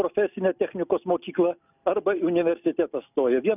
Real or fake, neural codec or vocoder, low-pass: real; none; 3.6 kHz